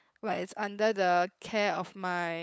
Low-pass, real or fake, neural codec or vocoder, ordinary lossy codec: none; fake; codec, 16 kHz, 8 kbps, FunCodec, trained on LibriTTS, 25 frames a second; none